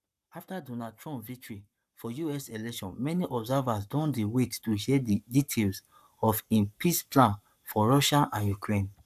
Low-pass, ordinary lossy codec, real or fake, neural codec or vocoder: 14.4 kHz; none; fake; codec, 44.1 kHz, 7.8 kbps, Pupu-Codec